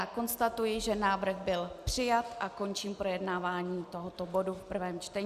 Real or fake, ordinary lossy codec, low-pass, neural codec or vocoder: real; Opus, 64 kbps; 14.4 kHz; none